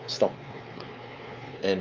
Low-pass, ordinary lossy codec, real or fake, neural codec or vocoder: 7.2 kHz; Opus, 24 kbps; fake; codec, 16 kHz, 4 kbps, X-Codec, WavLM features, trained on Multilingual LibriSpeech